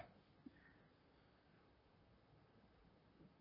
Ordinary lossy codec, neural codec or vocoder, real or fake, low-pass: MP3, 24 kbps; vocoder, 44.1 kHz, 128 mel bands, Pupu-Vocoder; fake; 5.4 kHz